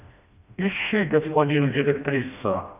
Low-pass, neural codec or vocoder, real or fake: 3.6 kHz; codec, 16 kHz, 1 kbps, FreqCodec, smaller model; fake